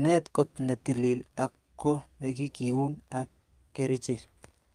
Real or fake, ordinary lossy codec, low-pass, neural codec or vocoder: fake; Opus, 32 kbps; 14.4 kHz; codec, 32 kHz, 1.9 kbps, SNAC